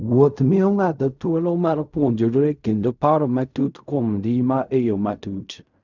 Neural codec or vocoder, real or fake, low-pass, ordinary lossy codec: codec, 16 kHz in and 24 kHz out, 0.4 kbps, LongCat-Audio-Codec, fine tuned four codebook decoder; fake; 7.2 kHz; none